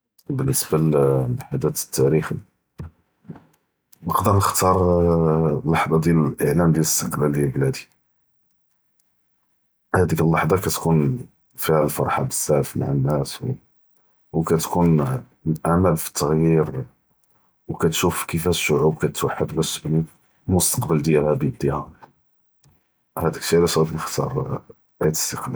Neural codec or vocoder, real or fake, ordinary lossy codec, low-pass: none; real; none; none